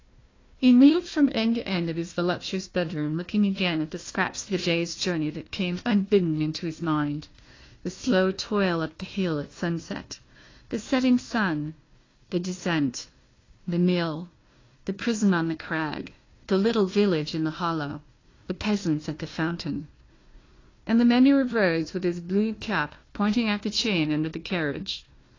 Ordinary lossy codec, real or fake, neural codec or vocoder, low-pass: AAC, 32 kbps; fake; codec, 16 kHz, 1 kbps, FunCodec, trained on Chinese and English, 50 frames a second; 7.2 kHz